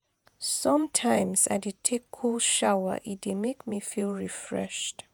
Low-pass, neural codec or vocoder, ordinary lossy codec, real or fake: none; vocoder, 48 kHz, 128 mel bands, Vocos; none; fake